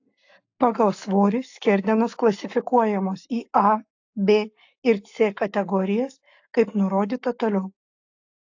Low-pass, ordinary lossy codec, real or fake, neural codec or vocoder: 7.2 kHz; AAC, 48 kbps; fake; codec, 44.1 kHz, 7.8 kbps, Pupu-Codec